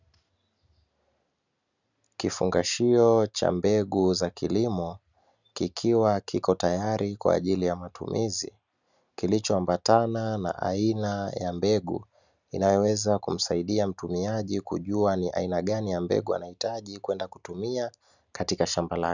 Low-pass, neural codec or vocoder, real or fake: 7.2 kHz; none; real